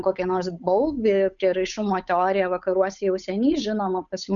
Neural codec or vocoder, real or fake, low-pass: codec, 16 kHz, 8 kbps, FunCodec, trained on Chinese and English, 25 frames a second; fake; 7.2 kHz